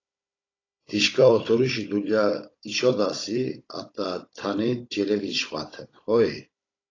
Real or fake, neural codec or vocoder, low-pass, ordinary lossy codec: fake; codec, 16 kHz, 16 kbps, FunCodec, trained on Chinese and English, 50 frames a second; 7.2 kHz; AAC, 32 kbps